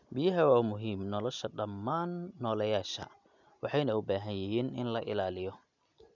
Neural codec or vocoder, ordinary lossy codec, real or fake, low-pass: none; none; real; 7.2 kHz